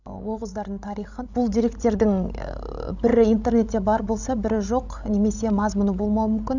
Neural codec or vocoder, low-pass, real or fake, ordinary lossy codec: codec, 16 kHz, 16 kbps, FreqCodec, larger model; 7.2 kHz; fake; none